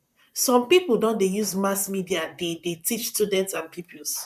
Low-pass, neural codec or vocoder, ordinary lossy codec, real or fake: 14.4 kHz; vocoder, 44.1 kHz, 128 mel bands, Pupu-Vocoder; none; fake